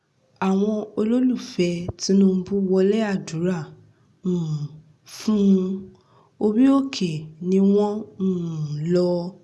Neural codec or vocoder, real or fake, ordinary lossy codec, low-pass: none; real; none; none